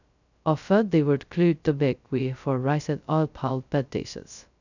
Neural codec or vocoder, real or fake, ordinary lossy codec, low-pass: codec, 16 kHz, 0.2 kbps, FocalCodec; fake; none; 7.2 kHz